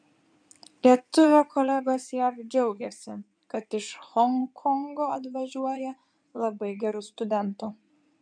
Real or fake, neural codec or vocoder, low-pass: fake; codec, 16 kHz in and 24 kHz out, 2.2 kbps, FireRedTTS-2 codec; 9.9 kHz